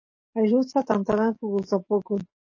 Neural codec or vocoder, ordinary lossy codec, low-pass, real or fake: codec, 44.1 kHz, 7.8 kbps, DAC; MP3, 32 kbps; 7.2 kHz; fake